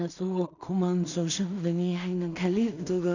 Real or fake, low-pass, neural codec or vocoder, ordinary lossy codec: fake; 7.2 kHz; codec, 16 kHz in and 24 kHz out, 0.4 kbps, LongCat-Audio-Codec, two codebook decoder; none